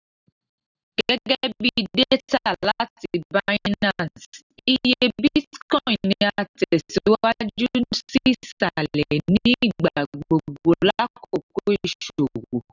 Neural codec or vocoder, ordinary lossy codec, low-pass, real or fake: none; none; 7.2 kHz; real